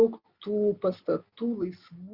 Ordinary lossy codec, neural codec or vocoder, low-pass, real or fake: Opus, 64 kbps; none; 5.4 kHz; real